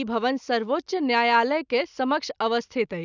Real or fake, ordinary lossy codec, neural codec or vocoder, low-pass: real; none; none; 7.2 kHz